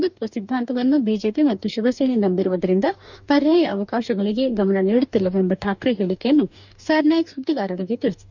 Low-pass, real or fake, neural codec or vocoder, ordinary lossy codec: 7.2 kHz; fake; codec, 44.1 kHz, 2.6 kbps, DAC; none